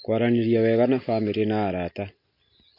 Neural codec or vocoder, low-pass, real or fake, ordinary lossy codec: none; 5.4 kHz; real; MP3, 32 kbps